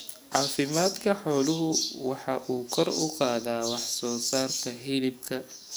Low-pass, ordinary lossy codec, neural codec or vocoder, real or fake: none; none; codec, 44.1 kHz, 7.8 kbps, DAC; fake